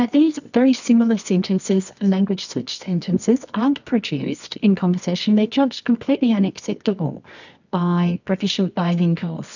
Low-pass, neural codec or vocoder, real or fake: 7.2 kHz; codec, 24 kHz, 0.9 kbps, WavTokenizer, medium music audio release; fake